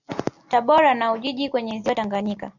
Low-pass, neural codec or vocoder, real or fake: 7.2 kHz; none; real